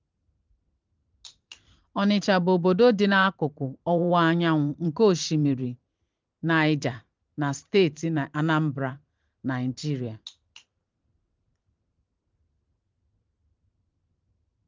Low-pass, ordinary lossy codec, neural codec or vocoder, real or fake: 7.2 kHz; Opus, 32 kbps; vocoder, 44.1 kHz, 128 mel bands every 512 samples, BigVGAN v2; fake